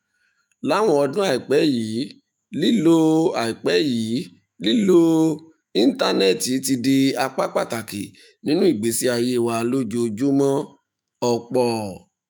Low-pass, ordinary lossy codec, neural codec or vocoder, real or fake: none; none; autoencoder, 48 kHz, 128 numbers a frame, DAC-VAE, trained on Japanese speech; fake